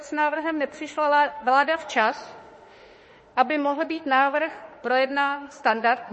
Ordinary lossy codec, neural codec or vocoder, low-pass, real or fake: MP3, 32 kbps; autoencoder, 48 kHz, 32 numbers a frame, DAC-VAE, trained on Japanese speech; 10.8 kHz; fake